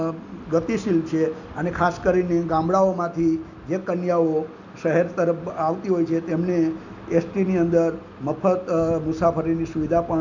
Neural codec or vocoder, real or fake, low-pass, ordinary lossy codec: none; real; 7.2 kHz; none